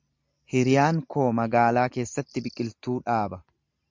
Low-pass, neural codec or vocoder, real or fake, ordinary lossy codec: 7.2 kHz; none; real; MP3, 64 kbps